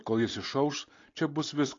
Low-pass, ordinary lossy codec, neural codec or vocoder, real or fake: 7.2 kHz; AAC, 32 kbps; none; real